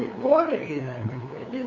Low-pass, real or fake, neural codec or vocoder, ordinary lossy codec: 7.2 kHz; fake; codec, 16 kHz, 2 kbps, FunCodec, trained on LibriTTS, 25 frames a second; none